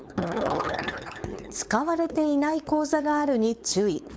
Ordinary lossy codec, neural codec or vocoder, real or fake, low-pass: none; codec, 16 kHz, 4.8 kbps, FACodec; fake; none